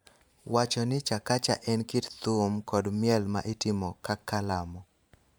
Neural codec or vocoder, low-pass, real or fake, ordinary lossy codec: vocoder, 44.1 kHz, 128 mel bands every 512 samples, BigVGAN v2; none; fake; none